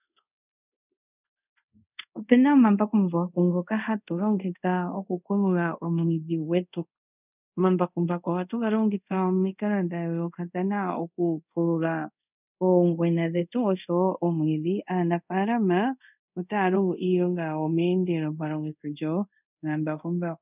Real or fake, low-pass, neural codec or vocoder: fake; 3.6 kHz; codec, 24 kHz, 0.5 kbps, DualCodec